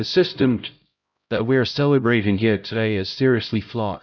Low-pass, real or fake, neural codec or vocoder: 7.2 kHz; fake; codec, 16 kHz, 0.5 kbps, X-Codec, HuBERT features, trained on LibriSpeech